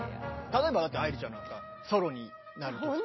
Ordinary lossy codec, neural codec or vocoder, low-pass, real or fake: MP3, 24 kbps; none; 7.2 kHz; real